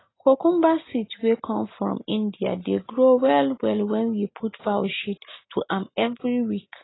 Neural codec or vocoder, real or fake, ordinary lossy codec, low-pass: none; real; AAC, 16 kbps; 7.2 kHz